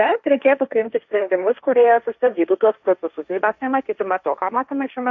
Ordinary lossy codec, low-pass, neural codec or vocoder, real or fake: AAC, 64 kbps; 7.2 kHz; codec, 16 kHz, 1.1 kbps, Voila-Tokenizer; fake